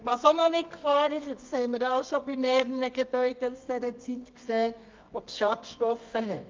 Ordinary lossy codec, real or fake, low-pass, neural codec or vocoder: Opus, 24 kbps; fake; 7.2 kHz; codec, 24 kHz, 0.9 kbps, WavTokenizer, medium music audio release